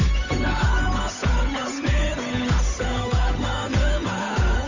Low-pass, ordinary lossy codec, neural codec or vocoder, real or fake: 7.2 kHz; AAC, 48 kbps; codec, 16 kHz, 8 kbps, FunCodec, trained on Chinese and English, 25 frames a second; fake